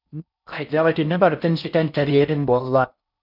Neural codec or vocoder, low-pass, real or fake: codec, 16 kHz in and 24 kHz out, 0.6 kbps, FocalCodec, streaming, 4096 codes; 5.4 kHz; fake